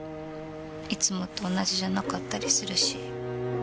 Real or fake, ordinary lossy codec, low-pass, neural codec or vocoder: real; none; none; none